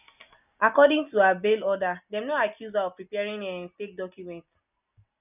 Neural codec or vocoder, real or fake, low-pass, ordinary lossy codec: none; real; 3.6 kHz; none